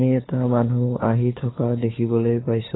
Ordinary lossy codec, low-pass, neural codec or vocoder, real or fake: AAC, 16 kbps; 7.2 kHz; codec, 16 kHz, 4 kbps, FunCodec, trained on LibriTTS, 50 frames a second; fake